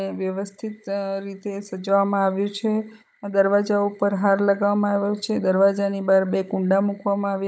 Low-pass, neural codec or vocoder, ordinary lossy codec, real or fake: none; codec, 16 kHz, 16 kbps, FunCodec, trained on Chinese and English, 50 frames a second; none; fake